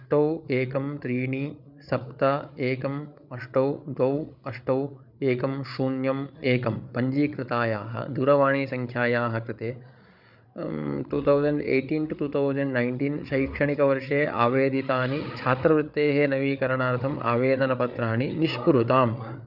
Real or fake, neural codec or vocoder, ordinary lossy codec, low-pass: fake; codec, 16 kHz, 8 kbps, FreqCodec, larger model; none; 5.4 kHz